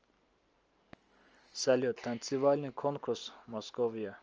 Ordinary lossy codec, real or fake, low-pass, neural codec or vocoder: Opus, 24 kbps; real; 7.2 kHz; none